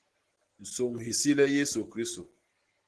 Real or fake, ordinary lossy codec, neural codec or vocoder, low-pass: real; Opus, 16 kbps; none; 10.8 kHz